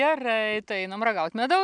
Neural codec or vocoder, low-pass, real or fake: none; 9.9 kHz; real